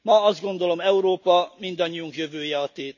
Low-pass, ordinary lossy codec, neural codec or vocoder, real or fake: 7.2 kHz; none; none; real